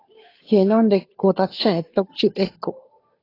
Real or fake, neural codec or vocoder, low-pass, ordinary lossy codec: fake; codec, 16 kHz, 2 kbps, FunCodec, trained on Chinese and English, 25 frames a second; 5.4 kHz; AAC, 24 kbps